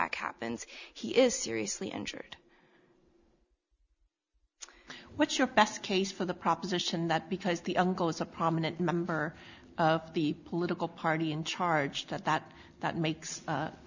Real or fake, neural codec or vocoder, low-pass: real; none; 7.2 kHz